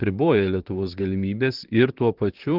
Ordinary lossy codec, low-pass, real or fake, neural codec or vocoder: Opus, 16 kbps; 5.4 kHz; fake; vocoder, 44.1 kHz, 128 mel bands, Pupu-Vocoder